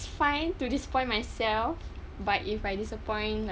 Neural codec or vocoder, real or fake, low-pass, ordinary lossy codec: none; real; none; none